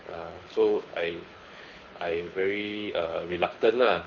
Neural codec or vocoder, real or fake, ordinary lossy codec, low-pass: codec, 24 kHz, 6 kbps, HILCodec; fake; none; 7.2 kHz